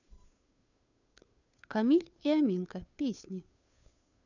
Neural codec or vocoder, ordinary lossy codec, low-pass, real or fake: codec, 16 kHz, 2 kbps, FunCodec, trained on Chinese and English, 25 frames a second; none; 7.2 kHz; fake